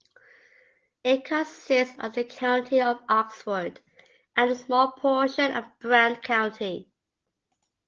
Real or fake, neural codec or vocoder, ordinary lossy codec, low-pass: real; none; Opus, 16 kbps; 7.2 kHz